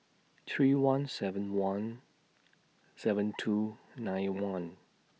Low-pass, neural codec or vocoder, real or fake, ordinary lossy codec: none; none; real; none